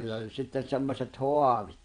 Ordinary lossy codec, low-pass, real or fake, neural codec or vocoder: none; 9.9 kHz; fake; vocoder, 22.05 kHz, 80 mel bands, WaveNeXt